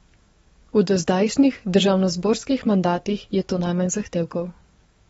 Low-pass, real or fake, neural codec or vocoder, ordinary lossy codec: 19.8 kHz; fake; codec, 44.1 kHz, 7.8 kbps, DAC; AAC, 24 kbps